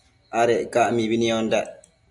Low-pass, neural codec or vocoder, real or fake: 10.8 kHz; none; real